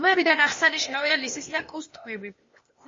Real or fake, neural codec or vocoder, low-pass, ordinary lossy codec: fake; codec, 16 kHz, 0.8 kbps, ZipCodec; 7.2 kHz; MP3, 32 kbps